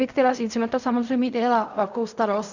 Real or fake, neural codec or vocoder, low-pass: fake; codec, 16 kHz in and 24 kHz out, 0.4 kbps, LongCat-Audio-Codec, fine tuned four codebook decoder; 7.2 kHz